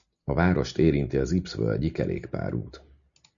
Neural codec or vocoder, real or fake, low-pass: none; real; 7.2 kHz